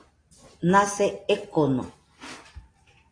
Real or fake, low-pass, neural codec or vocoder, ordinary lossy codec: real; 9.9 kHz; none; AAC, 32 kbps